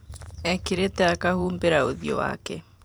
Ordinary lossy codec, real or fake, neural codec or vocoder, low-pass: none; fake; vocoder, 44.1 kHz, 128 mel bands every 512 samples, BigVGAN v2; none